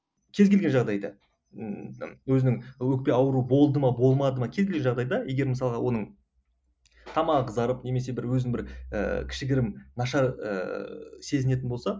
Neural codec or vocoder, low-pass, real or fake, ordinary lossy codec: none; none; real; none